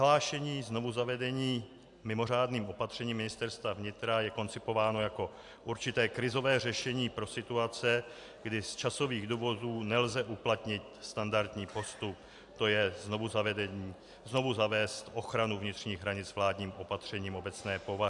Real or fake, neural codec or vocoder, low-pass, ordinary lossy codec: real; none; 10.8 kHz; AAC, 64 kbps